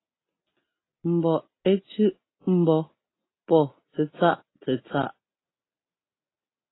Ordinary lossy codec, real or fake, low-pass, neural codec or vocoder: AAC, 16 kbps; real; 7.2 kHz; none